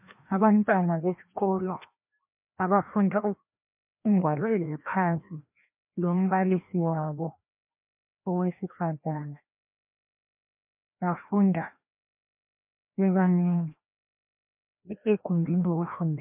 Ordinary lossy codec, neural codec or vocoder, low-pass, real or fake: MP3, 32 kbps; codec, 16 kHz, 1 kbps, FreqCodec, larger model; 3.6 kHz; fake